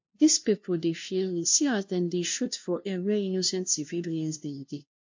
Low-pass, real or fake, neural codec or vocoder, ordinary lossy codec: 7.2 kHz; fake; codec, 16 kHz, 0.5 kbps, FunCodec, trained on LibriTTS, 25 frames a second; MP3, 48 kbps